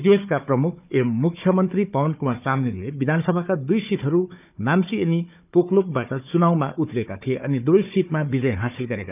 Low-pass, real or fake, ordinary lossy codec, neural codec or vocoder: 3.6 kHz; fake; none; codec, 16 kHz, 4 kbps, FunCodec, trained on Chinese and English, 50 frames a second